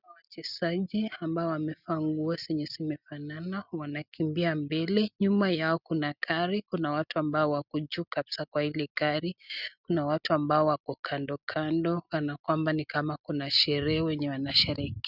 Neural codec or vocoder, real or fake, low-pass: none; real; 5.4 kHz